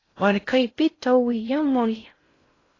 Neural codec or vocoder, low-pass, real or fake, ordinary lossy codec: codec, 16 kHz in and 24 kHz out, 0.6 kbps, FocalCodec, streaming, 4096 codes; 7.2 kHz; fake; AAC, 32 kbps